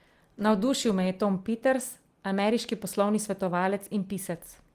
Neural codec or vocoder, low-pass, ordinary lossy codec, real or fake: none; 14.4 kHz; Opus, 24 kbps; real